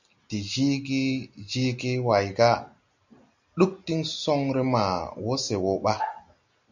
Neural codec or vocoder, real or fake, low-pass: none; real; 7.2 kHz